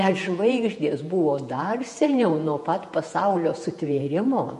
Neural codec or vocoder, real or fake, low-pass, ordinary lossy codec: vocoder, 48 kHz, 128 mel bands, Vocos; fake; 14.4 kHz; MP3, 48 kbps